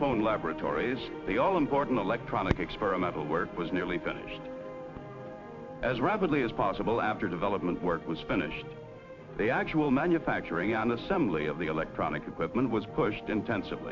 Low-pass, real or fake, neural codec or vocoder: 7.2 kHz; fake; vocoder, 44.1 kHz, 128 mel bands every 512 samples, BigVGAN v2